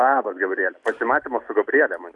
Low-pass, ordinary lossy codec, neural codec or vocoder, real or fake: 10.8 kHz; AAC, 64 kbps; none; real